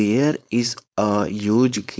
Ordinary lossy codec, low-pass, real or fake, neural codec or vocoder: none; none; fake; codec, 16 kHz, 4.8 kbps, FACodec